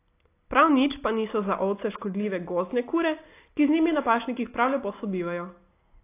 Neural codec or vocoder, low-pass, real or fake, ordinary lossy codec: none; 3.6 kHz; real; AAC, 24 kbps